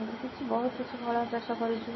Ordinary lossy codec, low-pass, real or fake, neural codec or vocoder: MP3, 24 kbps; 7.2 kHz; real; none